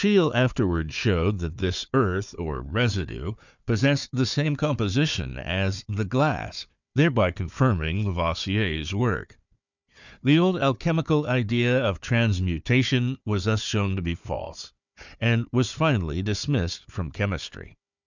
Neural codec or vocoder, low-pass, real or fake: codec, 16 kHz, 4 kbps, FunCodec, trained on Chinese and English, 50 frames a second; 7.2 kHz; fake